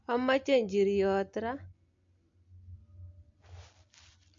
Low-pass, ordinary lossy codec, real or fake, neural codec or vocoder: 7.2 kHz; MP3, 48 kbps; real; none